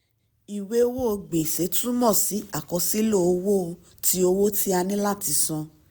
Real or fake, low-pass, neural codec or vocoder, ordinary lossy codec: real; none; none; none